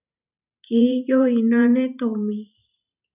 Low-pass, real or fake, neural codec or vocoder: 3.6 kHz; fake; vocoder, 44.1 kHz, 128 mel bands every 512 samples, BigVGAN v2